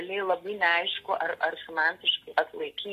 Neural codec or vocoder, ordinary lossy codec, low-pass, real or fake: none; AAC, 48 kbps; 14.4 kHz; real